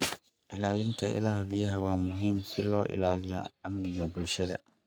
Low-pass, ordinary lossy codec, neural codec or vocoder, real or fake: none; none; codec, 44.1 kHz, 3.4 kbps, Pupu-Codec; fake